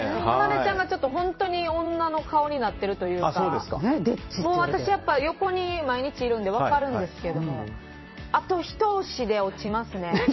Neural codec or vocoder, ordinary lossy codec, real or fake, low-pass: vocoder, 44.1 kHz, 128 mel bands every 256 samples, BigVGAN v2; MP3, 24 kbps; fake; 7.2 kHz